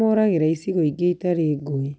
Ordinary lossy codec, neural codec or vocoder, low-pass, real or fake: none; none; none; real